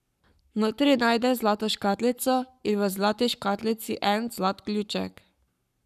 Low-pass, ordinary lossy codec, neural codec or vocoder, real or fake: 14.4 kHz; none; codec, 44.1 kHz, 7.8 kbps, Pupu-Codec; fake